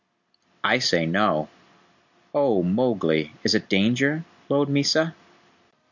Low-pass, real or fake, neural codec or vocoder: 7.2 kHz; real; none